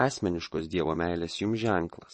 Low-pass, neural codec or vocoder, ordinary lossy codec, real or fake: 9.9 kHz; vocoder, 22.05 kHz, 80 mel bands, WaveNeXt; MP3, 32 kbps; fake